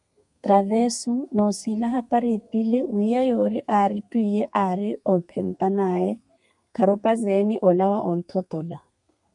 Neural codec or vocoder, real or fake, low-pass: codec, 32 kHz, 1.9 kbps, SNAC; fake; 10.8 kHz